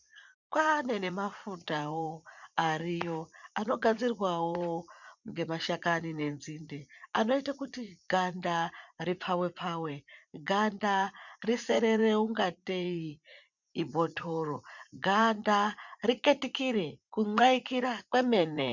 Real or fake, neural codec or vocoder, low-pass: fake; vocoder, 44.1 kHz, 80 mel bands, Vocos; 7.2 kHz